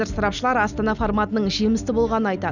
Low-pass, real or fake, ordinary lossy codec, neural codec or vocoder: 7.2 kHz; real; none; none